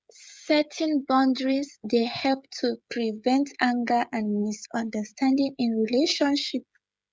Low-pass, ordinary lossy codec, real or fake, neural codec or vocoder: none; none; fake; codec, 16 kHz, 16 kbps, FreqCodec, smaller model